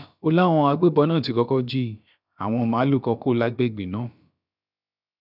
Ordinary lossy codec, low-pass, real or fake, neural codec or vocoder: none; 5.4 kHz; fake; codec, 16 kHz, about 1 kbps, DyCAST, with the encoder's durations